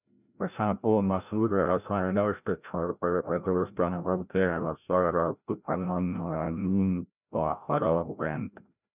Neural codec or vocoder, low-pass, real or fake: codec, 16 kHz, 0.5 kbps, FreqCodec, larger model; 3.6 kHz; fake